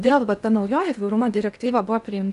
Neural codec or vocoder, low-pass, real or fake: codec, 16 kHz in and 24 kHz out, 0.8 kbps, FocalCodec, streaming, 65536 codes; 10.8 kHz; fake